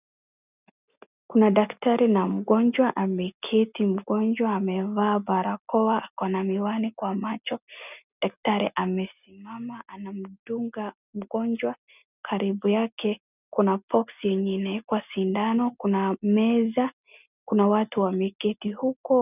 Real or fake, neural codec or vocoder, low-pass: real; none; 3.6 kHz